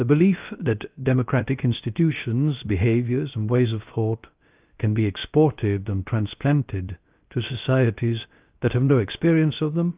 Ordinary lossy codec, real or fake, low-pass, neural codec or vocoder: Opus, 32 kbps; fake; 3.6 kHz; codec, 16 kHz, 0.3 kbps, FocalCodec